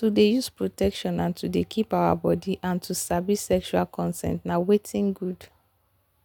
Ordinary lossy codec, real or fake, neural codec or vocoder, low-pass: none; real; none; 19.8 kHz